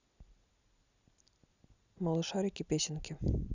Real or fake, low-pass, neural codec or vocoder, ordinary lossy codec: real; 7.2 kHz; none; none